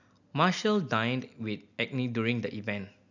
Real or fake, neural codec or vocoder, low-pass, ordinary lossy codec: real; none; 7.2 kHz; none